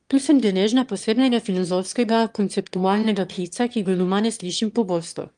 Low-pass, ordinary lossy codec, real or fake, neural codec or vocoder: 9.9 kHz; Opus, 32 kbps; fake; autoencoder, 22.05 kHz, a latent of 192 numbers a frame, VITS, trained on one speaker